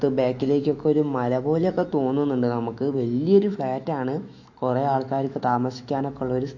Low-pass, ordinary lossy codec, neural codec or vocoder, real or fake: 7.2 kHz; AAC, 48 kbps; autoencoder, 48 kHz, 128 numbers a frame, DAC-VAE, trained on Japanese speech; fake